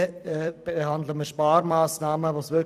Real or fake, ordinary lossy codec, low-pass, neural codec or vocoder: fake; none; 14.4 kHz; vocoder, 44.1 kHz, 128 mel bands every 256 samples, BigVGAN v2